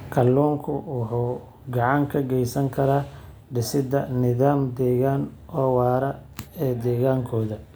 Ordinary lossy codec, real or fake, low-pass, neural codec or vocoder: none; real; none; none